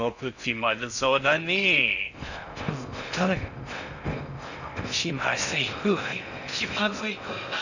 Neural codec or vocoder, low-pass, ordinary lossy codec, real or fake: codec, 16 kHz in and 24 kHz out, 0.6 kbps, FocalCodec, streaming, 4096 codes; 7.2 kHz; none; fake